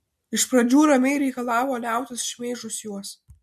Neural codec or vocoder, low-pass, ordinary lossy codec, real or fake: none; 14.4 kHz; MP3, 64 kbps; real